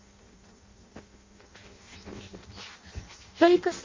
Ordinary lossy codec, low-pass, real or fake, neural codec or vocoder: MP3, 48 kbps; 7.2 kHz; fake; codec, 16 kHz in and 24 kHz out, 0.6 kbps, FireRedTTS-2 codec